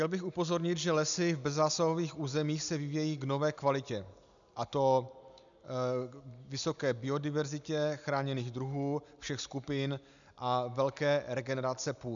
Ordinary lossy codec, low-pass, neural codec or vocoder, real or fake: MP3, 96 kbps; 7.2 kHz; none; real